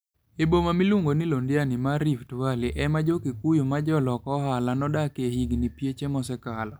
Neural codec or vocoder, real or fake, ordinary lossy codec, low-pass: none; real; none; none